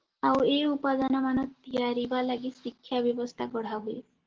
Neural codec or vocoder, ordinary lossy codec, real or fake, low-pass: none; Opus, 16 kbps; real; 7.2 kHz